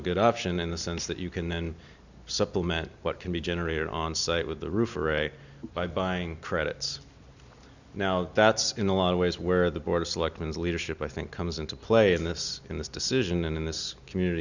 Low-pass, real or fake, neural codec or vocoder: 7.2 kHz; real; none